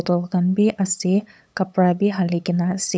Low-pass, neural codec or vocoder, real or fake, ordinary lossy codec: none; codec, 16 kHz, 8 kbps, FunCodec, trained on LibriTTS, 25 frames a second; fake; none